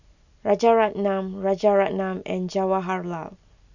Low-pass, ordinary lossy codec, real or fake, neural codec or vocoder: 7.2 kHz; none; real; none